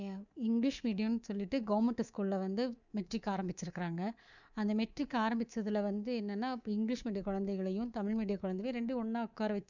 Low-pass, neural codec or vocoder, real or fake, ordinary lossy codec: 7.2 kHz; codec, 24 kHz, 3.1 kbps, DualCodec; fake; none